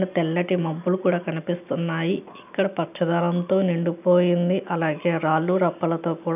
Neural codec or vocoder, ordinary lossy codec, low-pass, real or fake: none; none; 3.6 kHz; real